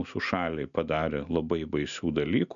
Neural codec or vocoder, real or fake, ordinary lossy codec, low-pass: none; real; MP3, 96 kbps; 7.2 kHz